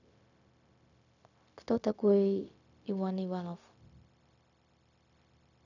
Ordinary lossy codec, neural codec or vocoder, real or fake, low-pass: none; codec, 16 kHz, 0.4 kbps, LongCat-Audio-Codec; fake; 7.2 kHz